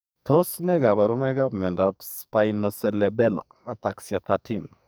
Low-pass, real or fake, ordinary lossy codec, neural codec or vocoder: none; fake; none; codec, 44.1 kHz, 2.6 kbps, SNAC